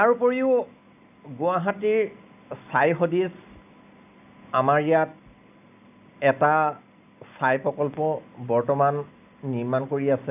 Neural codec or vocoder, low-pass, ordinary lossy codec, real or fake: none; 3.6 kHz; none; real